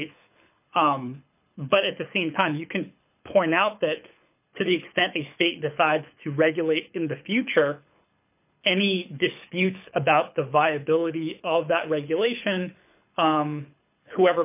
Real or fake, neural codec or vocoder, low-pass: fake; codec, 24 kHz, 6 kbps, HILCodec; 3.6 kHz